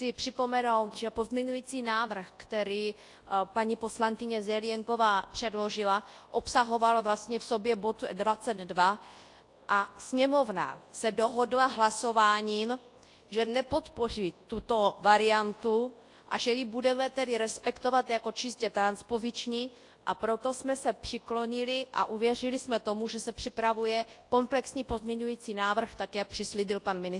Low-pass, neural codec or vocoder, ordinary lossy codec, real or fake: 10.8 kHz; codec, 24 kHz, 0.9 kbps, WavTokenizer, large speech release; AAC, 48 kbps; fake